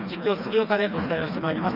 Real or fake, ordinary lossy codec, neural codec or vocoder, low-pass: fake; none; codec, 16 kHz, 2 kbps, FreqCodec, smaller model; 5.4 kHz